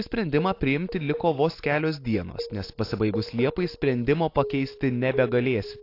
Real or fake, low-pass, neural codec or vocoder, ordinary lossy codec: real; 5.4 kHz; none; AAC, 32 kbps